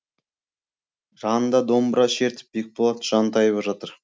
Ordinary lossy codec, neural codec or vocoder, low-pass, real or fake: none; none; none; real